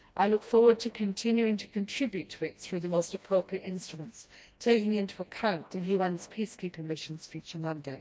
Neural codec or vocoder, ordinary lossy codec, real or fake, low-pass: codec, 16 kHz, 1 kbps, FreqCodec, smaller model; none; fake; none